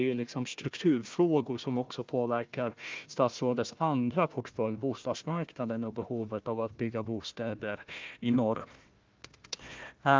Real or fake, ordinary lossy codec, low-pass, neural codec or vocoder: fake; Opus, 24 kbps; 7.2 kHz; codec, 16 kHz, 1 kbps, FunCodec, trained on Chinese and English, 50 frames a second